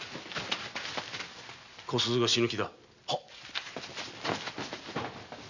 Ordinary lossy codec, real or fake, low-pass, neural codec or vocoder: none; real; 7.2 kHz; none